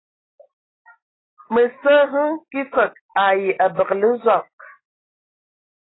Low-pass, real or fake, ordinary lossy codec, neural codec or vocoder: 7.2 kHz; real; AAC, 16 kbps; none